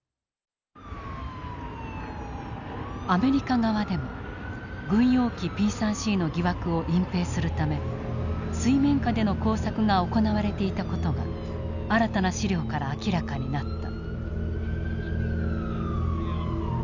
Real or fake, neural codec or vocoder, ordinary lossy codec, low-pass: real; none; none; 7.2 kHz